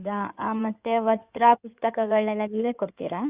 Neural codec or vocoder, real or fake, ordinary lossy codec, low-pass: codec, 16 kHz in and 24 kHz out, 2.2 kbps, FireRedTTS-2 codec; fake; Opus, 64 kbps; 3.6 kHz